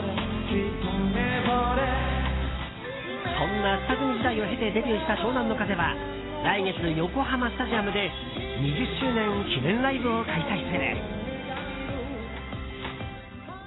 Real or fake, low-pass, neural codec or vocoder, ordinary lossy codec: real; 7.2 kHz; none; AAC, 16 kbps